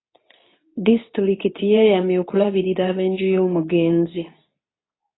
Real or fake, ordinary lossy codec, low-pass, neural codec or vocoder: fake; AAC, 16 kbps; 7.2 kHz; codec, 24 kHz, 0.9 kbps, WavTokenizer, medium speech release version 2